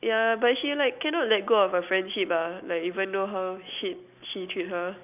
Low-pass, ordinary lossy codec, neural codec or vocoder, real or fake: 3.6 kHz; none; none; real